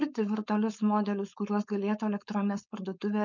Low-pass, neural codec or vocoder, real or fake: 7.2 kHz; codec, 16 kHz, 4.8 kbps, FACodec; fake